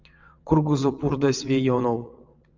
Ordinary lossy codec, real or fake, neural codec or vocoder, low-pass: MP3, 64 kbps; fake; vocoder, 22.05 kHz, 80 mel bands, WaveNeXt; 7.2 kHz